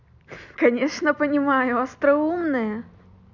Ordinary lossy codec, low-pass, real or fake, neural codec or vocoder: none; 7.2 kHz; real; none